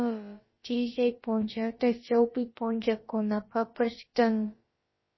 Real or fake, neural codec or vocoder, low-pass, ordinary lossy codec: fake; codec, 16 kHz, about 1 kbps, DyCAST, with the encoder's durations; 7.2 kHz; MP3, 24 kbps